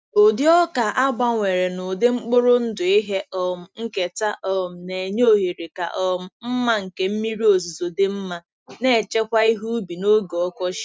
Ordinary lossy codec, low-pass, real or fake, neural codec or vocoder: none; none; real; none